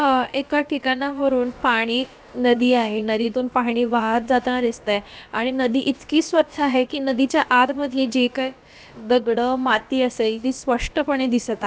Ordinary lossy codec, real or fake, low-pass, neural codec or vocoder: none; fake; none; codec, 16 kHz, about 1 kbps, DyCAST, with the encoder's durations